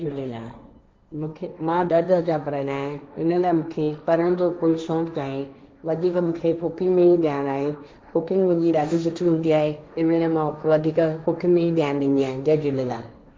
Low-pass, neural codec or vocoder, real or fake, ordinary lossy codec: none; codec, 16 kHz, 1.1 kbps, Voila-Tokenizer; fake; none